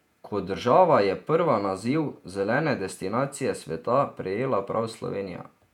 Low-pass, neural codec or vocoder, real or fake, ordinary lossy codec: 19.8 kHz; vocoder, 48 kHz, 128 mel bands, Vocos; fake; none